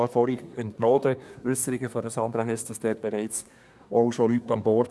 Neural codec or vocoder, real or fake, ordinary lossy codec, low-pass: codec, 24 kHz, 1 kbps, SNAC; fake; none; none